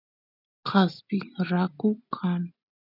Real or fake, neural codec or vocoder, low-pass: real; none; 5.4 kHz